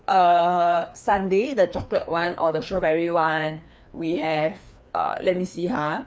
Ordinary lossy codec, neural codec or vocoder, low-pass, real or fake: none; codec, 16 kHz, 2 kbps, FreqCodec, larger model; none; fake